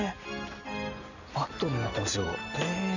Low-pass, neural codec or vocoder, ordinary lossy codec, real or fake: 7.2 kHz; none; none; real